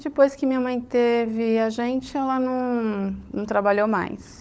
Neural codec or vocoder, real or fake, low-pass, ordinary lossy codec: codec, 16 kHz, 16 kbps, FunCodec, trained on LibriTTS, 50 frames a second; fake; none; none